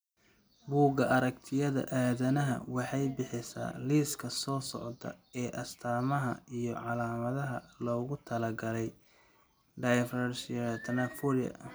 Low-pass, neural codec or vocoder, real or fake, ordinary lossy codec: none; none; real; none